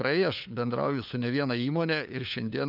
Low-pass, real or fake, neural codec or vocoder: 5.4 kHz; fake; codec, 16 kHz, 4 kbps, FunCodec, trained on LibriTTS, 50 frames a second